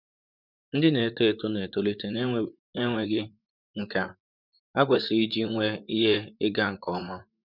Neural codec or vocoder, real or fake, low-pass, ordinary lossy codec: vocoder, 44.1 kHz, 128 mel bands, Pupu-Vocoder; fake; 5.4 kHz; none